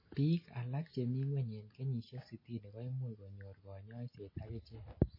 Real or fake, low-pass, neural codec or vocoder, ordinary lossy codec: real; 5.4 kHz; none; MP3, 24 kbps